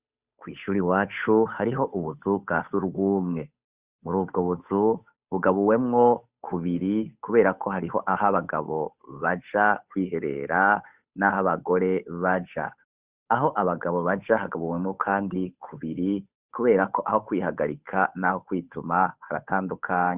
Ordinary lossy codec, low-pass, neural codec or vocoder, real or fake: Opus, 64 kbps; 3.6 kHz; codec, 16 kHz, 8 kbps, FunCodec, trained on Chinese and English, 25 frames a second; fake